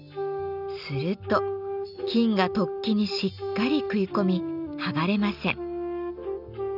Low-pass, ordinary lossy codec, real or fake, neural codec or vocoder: 5.4 kHz; none; real; none